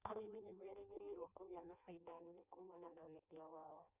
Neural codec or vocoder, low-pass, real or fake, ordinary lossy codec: codec, 16 kHz in and 24 kHz out, 0.6 kbps, FireRedTTS-2 codec; 3.6 kHz; fake; Opus, 64 kbps